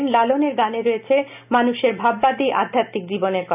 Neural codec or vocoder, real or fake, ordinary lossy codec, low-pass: none; real; none; 3.6 kHz